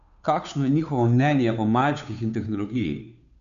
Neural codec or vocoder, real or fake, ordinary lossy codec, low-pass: codec, 16 kHz, 2 kbps, FunCodec, trained on Chinese and English, 25 frames a second; fake; MP3, 96 kbps; 7.2 kHz